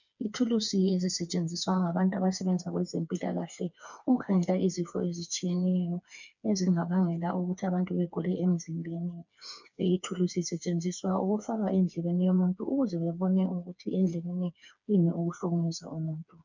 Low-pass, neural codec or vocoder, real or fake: 7.2 kHz; codec, 16 kHz, 4 kbps, FreqCodec, smaller model; fake